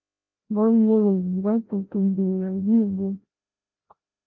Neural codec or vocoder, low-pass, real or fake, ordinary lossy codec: codec, 16 kHz, 0.5 kbps, FreqCodec, larger model; 7.2 kHz; fake; Opus, 32 kbps